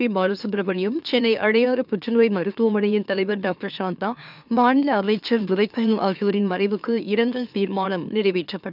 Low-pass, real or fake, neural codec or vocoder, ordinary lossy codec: 5.4 kHz; fake; autoencoder, 44.1 kHz, a latent of 192 numbers a frame, MeloTTS; none